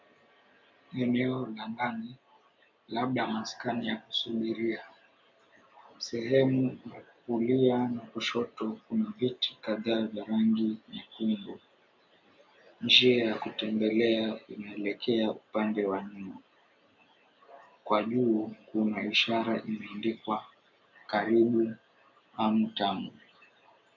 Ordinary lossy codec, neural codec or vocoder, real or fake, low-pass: MP3, 64 kbps; none; real; 7.2 kHz